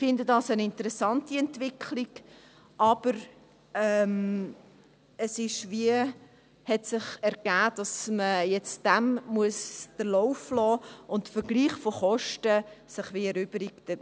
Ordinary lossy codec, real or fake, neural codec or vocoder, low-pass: none; real; none; none